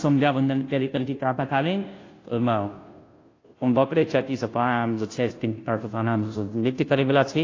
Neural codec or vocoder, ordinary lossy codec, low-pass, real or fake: codec, 16 kHz, 0.5 kbps, FunCodec, trained on Chinese and English, 25 frames a second; AAC, 32 kbps; 7.2 kHz; fake